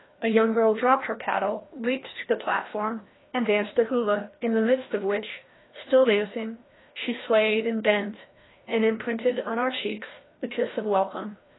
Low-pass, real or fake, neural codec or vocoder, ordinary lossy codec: 7.2 kHz; fake; codec, 16 kHz, 1 kbps, FreqCodec, larger model; AAC, 16 kbps